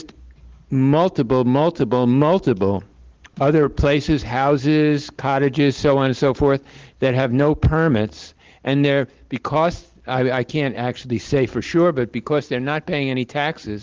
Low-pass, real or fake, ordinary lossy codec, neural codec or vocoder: 7.2 kHz; real; Opus, 16 kbps; none